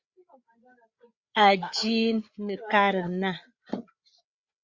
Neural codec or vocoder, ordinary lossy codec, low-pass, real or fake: codec, 16 kHz, 8 kbps, FreqCodec, larger model; Opus, 64 kbps; 7.2 kHz; fake